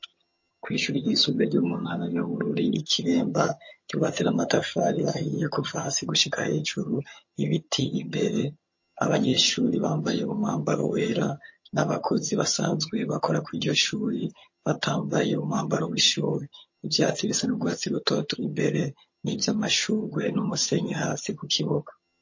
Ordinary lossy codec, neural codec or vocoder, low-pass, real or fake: MP3, 32 kbps; vocoder, 22.05 kHz, 80 mel bands, HiFi-GAN; 7.2 kHz; fake